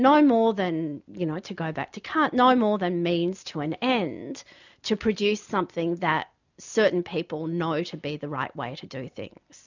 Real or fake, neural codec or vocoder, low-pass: real; none; 7.2 kHz